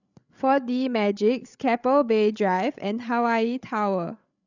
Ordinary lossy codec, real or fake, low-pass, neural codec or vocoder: none; fake; 7.2 kHz; codec, 16 kHz, 8 kbps, FreqCodec, larger model